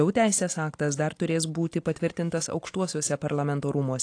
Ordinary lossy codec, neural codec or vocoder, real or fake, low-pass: AAC, 48 kbps; none; real; 9.9 kHz